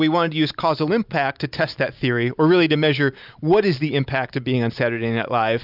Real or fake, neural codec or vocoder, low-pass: real; none; 5.4 kHz